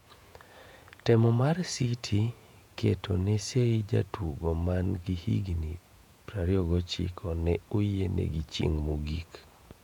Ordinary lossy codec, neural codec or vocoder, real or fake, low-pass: none; vocoder, 48 kHz, 128 mel bands, Vocos; fake; 19.8 kHz